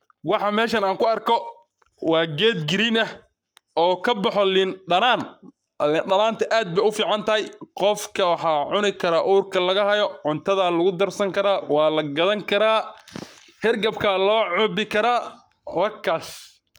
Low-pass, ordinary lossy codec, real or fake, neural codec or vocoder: none; none; fake; codec, 44.1 kHz, 7.8 kbps, Pupu-Codec